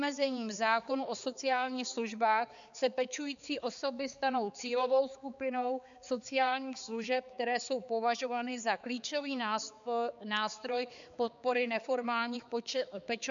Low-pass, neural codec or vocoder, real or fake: 7.2 kHz; codec, 16 kHz, 4 kbps, X-Codec, HuBERT features, trained on balanced general audio; fake